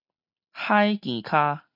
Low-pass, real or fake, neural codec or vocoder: 5.4 kHz; real; none